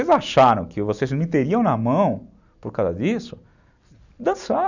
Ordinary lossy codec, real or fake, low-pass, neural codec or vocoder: none; real; 7.2 kHz; none